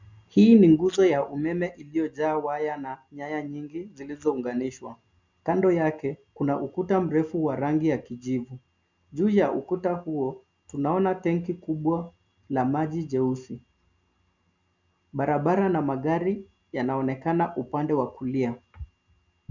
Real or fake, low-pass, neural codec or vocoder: real; 7.2 kHz; none